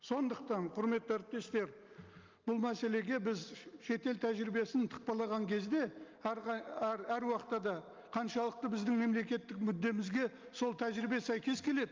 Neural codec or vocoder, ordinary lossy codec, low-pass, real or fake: none; Opus, 24 kbps; 7.2 kHz; real